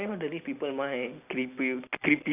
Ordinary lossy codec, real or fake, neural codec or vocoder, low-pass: none; real; none; 3.6 kHz